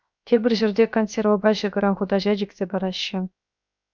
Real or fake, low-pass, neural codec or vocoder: fake; 7.2 kHz; codec, 16 kHz, 0.7 kbps, FocalCodec